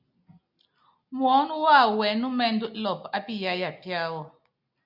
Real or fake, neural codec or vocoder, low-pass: real; none; 5.4 kHz